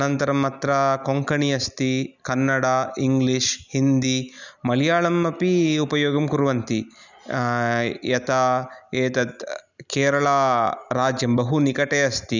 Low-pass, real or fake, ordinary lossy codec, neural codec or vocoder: 7.2 kHz; real; none; none